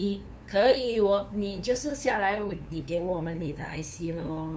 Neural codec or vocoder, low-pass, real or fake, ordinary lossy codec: codec, 16 kHz, 2 kbps, FunCodec, trained on LibriTTS, 25 frames a second; none; fake; none